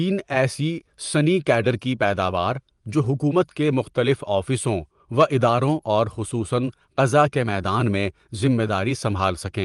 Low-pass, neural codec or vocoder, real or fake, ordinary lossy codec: 10.8 kHz; vocoder, 24 kHz, 100 mel bands, Vocos; fake; Opus, 32 kbps